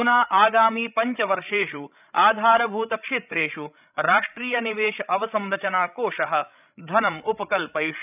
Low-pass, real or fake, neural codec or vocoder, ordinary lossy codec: 3.6 kHz; fake; codec, 16 kHz, 16 kbps, FreqCodec, larger model; none